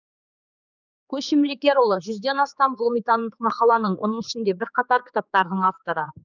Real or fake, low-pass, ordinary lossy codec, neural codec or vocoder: fake; 7.2 kHz; none; codec, 16 kHz, 4 kbps, X-Codec, HuBERT features, trained on general audio